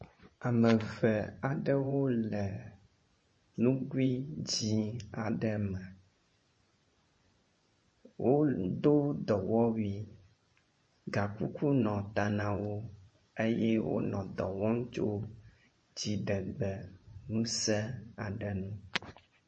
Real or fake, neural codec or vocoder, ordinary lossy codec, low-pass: fake; vocoder, 24 kHz, 100 mel bands, Vocos; MP3, 32 kbps; 9.9 kHz